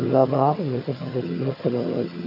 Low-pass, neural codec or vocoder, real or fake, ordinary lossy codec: 5.4 kHz; vocoder, 22.05 kHz, 80 mel bands, HiFi-GAN; fake; AAC, 24 kbps